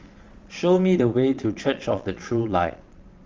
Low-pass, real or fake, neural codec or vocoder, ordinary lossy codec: 7.2 kHz; fake; vocoder, 22.05 kHz, 80 mel bands, Vocos; Opus, 32 kbps